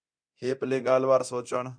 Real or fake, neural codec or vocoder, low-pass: fake; codec, 24 kHz, 0.9 kbps, DualCodec; 9.9 kHz